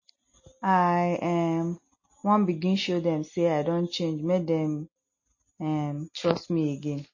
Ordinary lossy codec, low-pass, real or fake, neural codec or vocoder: MP3, 32 kbps; 7.2 kHz; real; none